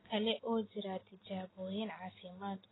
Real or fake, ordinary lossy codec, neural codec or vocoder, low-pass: real; AAC, 16 kbps; none; 7.2 kHz